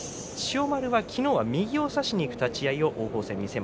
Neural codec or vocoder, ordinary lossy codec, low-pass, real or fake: none; none; none; real